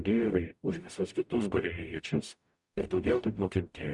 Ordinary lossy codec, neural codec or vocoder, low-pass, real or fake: Opus, 64 kbps; codec, 44.1 kHz, 0.9 kbps, DAC; 10.8 kHz; fake